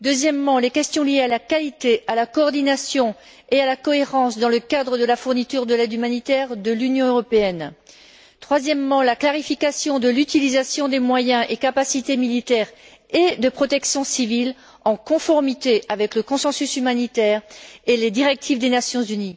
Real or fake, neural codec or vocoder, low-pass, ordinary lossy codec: real; none; none; none